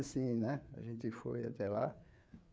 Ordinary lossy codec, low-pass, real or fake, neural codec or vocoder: none; none; fake; codec, 16 kHz, 4 kbps, FreqCodec, larger model